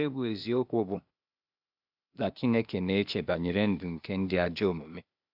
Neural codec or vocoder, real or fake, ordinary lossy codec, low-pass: codec, 16 kHz, 0.8 kbps, ZipCodec; fake; none; 5.4 kHz